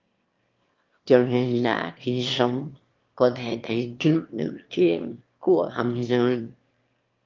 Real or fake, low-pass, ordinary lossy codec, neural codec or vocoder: fake; 7.2 kHz; Opus, 32 kbps; autoencoder, 22.05 kHz, a latent of 192 numbers a frame, VITS, trained on one speaker